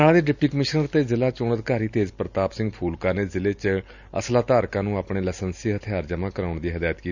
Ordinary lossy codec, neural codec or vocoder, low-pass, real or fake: none; none; 7.2 kHz; real